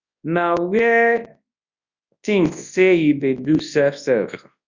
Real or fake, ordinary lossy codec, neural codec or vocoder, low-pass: fake; Opus, 64 kbps; codec, 24 kHz, 0.9 kbps, WavTokenizer, large speech release; 7.2 kHz